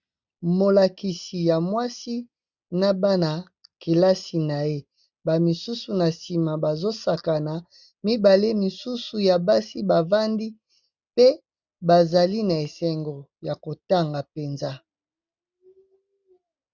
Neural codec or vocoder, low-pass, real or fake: none; 7.2 kHz; real